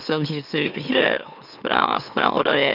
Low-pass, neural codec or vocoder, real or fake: 5.4 kHz; autoencoder, 44.1 kHz, a latent of 192 numbers a frame, MeloTTS; fake